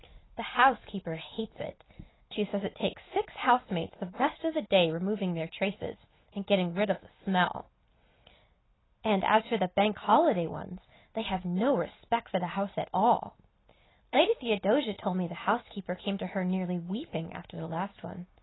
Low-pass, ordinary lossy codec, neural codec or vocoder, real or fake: 7.2 kHz; AAC, 16 kbps; none; real